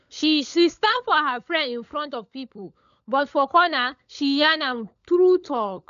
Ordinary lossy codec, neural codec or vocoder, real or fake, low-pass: none; codec, 16 kHz, 16 kbps, FunCodec, trained on LibriTTS, 50 frames a second; fake; 7.2 kHz